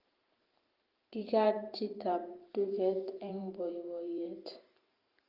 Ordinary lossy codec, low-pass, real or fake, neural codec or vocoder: Opus, 32 kbps; 5.4 kHz; fake; vocoder, 44.1 kHz, 128 mel bands every 512 samples, BigVGAN v2